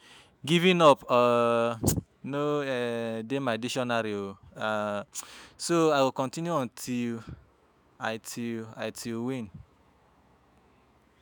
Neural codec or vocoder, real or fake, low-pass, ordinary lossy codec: autoencoder, 48 kHz, 128 numbers a frame, DAC-VAE, trained on Japanese speech; fake; none; none